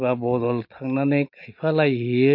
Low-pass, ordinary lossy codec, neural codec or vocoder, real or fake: 5.4 kHz; MP3, 32 kbps; none; real